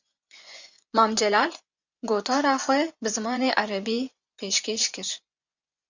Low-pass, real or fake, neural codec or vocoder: 7.2 kHz; fake; vocoder, 24 kHz, 100 mel bands, Vocos